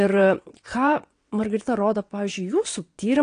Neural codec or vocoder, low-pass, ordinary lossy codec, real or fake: vocoder, 22.05 kHz, 80 mel bands, WaveNeXt; 9.9 kHz; AAC, 48 kbps; fake